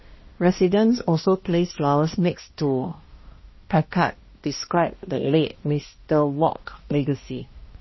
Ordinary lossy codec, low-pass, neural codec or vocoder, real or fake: MP3, 24 kbps; 7.2 kHz; codec, 16 kHz, 1 kbps, X-Codec, HuBERT features, trained on balanced general audio; fake